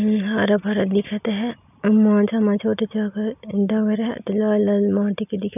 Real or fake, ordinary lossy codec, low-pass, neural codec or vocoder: real; none; 3.6 kHz; none